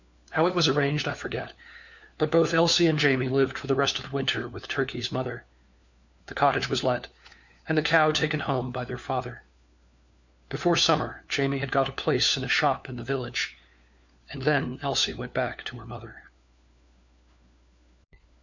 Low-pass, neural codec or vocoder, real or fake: 7.2 kHz; codec, 16 kHz, 4 kbps, FunCodec, trained on LibriTTS, 50 frames a second; fake